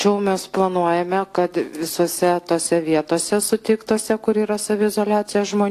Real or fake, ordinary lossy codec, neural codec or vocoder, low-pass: real; AAC, 64 kbps; none; 14.4 kHz